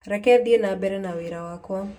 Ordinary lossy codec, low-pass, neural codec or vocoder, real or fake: none; 19.8 kHz; none; real